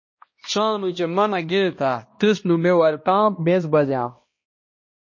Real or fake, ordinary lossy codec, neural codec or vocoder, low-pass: fake; MP3, 32 kbps; codec, 16 kHz, 1 kbps, X-Codec, HuBERT features, trained on balanced general audio; 7.2 kHz